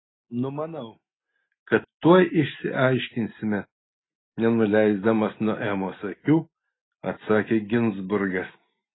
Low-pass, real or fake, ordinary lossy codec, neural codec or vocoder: 7.2 kHz; real; AAC, 16 kbps; none